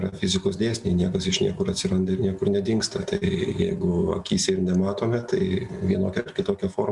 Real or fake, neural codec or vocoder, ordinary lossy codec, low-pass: real; none; Opus, 64 kbps; 10.8 kHz